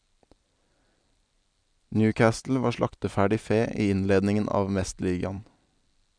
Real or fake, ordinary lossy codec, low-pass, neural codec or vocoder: real; none; 9.9 kHz; none